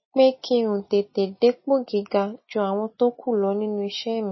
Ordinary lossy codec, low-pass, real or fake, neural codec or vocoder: MP3, 24 kbps; 7.2 kHz; real; none